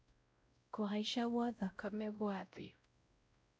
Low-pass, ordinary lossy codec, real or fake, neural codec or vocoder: none; none; fake; codec, 16 kHz, 0.5 kbps, X-Codec, WavLM features, trained on Multilingual LibriSpeech